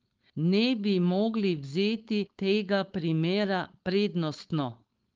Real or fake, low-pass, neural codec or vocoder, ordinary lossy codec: fake; 7.2 kHz; codec, 16 kHz, 4.8 kbps, FACodec; Opus, 32 kbps